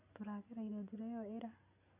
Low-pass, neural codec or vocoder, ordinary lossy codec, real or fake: 3.6 kHz; none; none; real